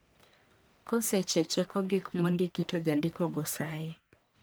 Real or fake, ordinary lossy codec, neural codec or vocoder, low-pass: fake; none; codec, 44.1 kHz, 1.7 kbps, Pupu-Codec; none